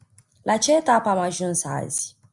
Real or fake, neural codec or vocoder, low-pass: real; none; 10.8 kHz